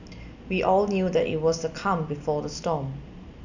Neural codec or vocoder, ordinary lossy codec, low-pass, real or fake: none; none; 7.2 kHz; real